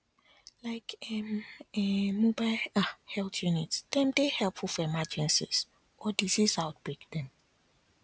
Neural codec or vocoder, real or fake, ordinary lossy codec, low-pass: none; real; none; none